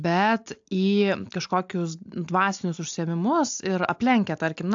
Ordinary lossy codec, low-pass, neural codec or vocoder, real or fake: AAC, 64 kbps; 7.2 kHz; none; real